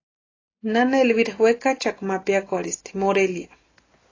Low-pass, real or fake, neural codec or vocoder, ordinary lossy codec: 7.2 kHz; real; none; AAC, 32 kbps